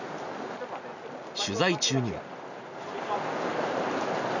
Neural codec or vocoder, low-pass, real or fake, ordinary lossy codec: none; 7.2 kHz; real; none